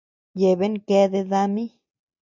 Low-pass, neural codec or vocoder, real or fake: 7.2 kHz; none; real